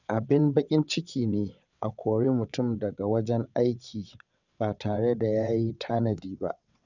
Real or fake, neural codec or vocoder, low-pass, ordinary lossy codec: fake; vocoder, 22.05 kHz, 80 mel bands, WaveNeXt; 7.2 kHz; none